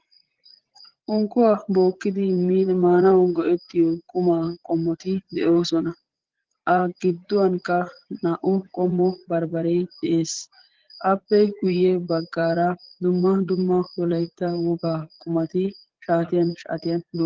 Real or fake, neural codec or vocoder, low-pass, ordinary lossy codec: fake; vocoder, 44.1 kHz, 80 mel bands, Vocos; 7.2 kHz; Opus, 16 kbps